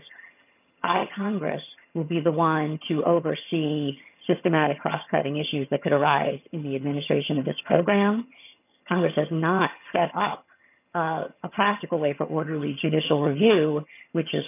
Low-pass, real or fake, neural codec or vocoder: 3.6 kHz; fake; vocoder, 22.05 kHz, 80 mel bands, HiFi-GAN